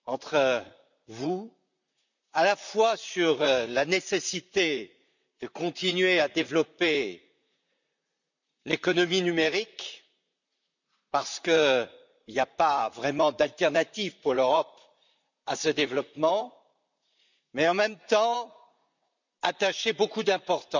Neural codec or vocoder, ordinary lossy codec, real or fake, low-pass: vocoder, 44.1 kHz, 128 mel bands, Pupu-Vocoder; none; fake; 7.2 kHz